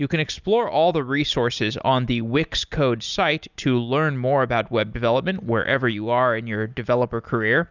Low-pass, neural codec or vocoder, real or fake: 7.2 kHz; none; real